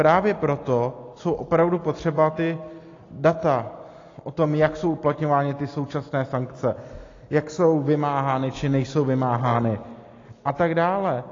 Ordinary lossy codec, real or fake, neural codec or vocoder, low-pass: AAC, 32 kbps; real; none; 7.2 kHz